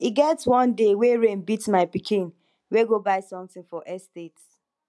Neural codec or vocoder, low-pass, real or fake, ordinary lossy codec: none; none; real; none